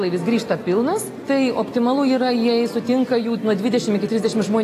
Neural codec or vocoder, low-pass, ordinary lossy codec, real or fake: none; 14.4 kHz; AAC, 48 kbps; real